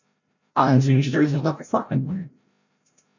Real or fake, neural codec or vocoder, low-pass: fake; codec, 16 kHz, 0.5 kbps, FreqCodec, larger model; 7.2 kHz